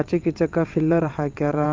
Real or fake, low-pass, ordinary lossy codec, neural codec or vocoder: fake; 7.2 kHz; Opus, 24 kbps; vocoder, 22.05 kHz, 80 mel bands, WaveNeXt